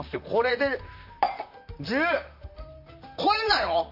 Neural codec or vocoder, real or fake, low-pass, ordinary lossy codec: vocoder, 44.1 kHz, 128 mel bands, Pupu-Vocoder; fake; 5.4 kHz; none